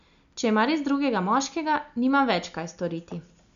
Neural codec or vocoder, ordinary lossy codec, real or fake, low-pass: none; none; real; 7.2 kHz